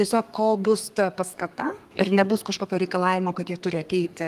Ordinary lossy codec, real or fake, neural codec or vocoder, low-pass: Opus, 32 kbps; fake; codec, 32 kHz, 1.9 kbps, SNAC; 14.4 kHz